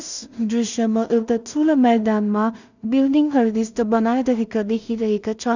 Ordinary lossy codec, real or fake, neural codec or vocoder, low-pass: none; fake; codec, 16 kHz in and 24 kHz out, 0.4 kbps, LongCat-Audio-Codec, two codebook decoder; 7.2 kHz